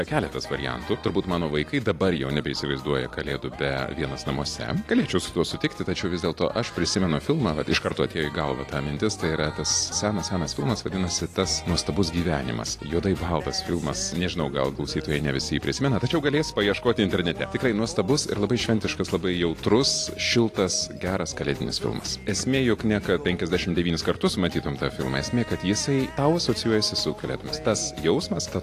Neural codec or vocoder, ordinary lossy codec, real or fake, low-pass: none; AAC, 48 kbps; real; 14.4 kHz